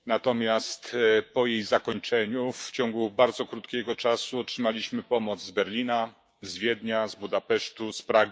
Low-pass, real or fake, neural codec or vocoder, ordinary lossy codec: none; fake; codec, 16 kHz, 6 kbps, DAC; none